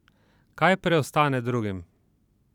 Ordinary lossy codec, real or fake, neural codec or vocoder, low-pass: none; fake; vocoder, 44.1 kHz, 128 mel bands every 512 samples, BigVGAN v2; 19.8 kHz